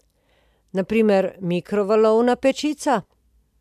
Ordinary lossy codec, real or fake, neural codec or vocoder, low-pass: MP3, 96 kbps; real; none; 14.4 kHz